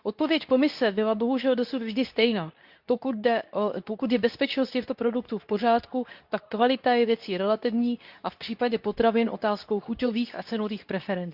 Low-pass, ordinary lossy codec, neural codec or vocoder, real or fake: 5.4 kHz; Opus, 64 kbps; codec, 24 kHz, 0.9 kbps, WavTokenizer, medium speech release version 2; fake